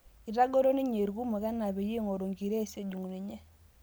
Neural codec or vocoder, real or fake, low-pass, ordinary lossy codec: none; real; none; none